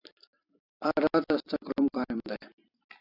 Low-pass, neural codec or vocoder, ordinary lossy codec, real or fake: 5.4 kHz; vocoder, 44.1 kHz, 128 mel bands every 256 samples, BigVGAN v2; AAC, 32 kbps; fake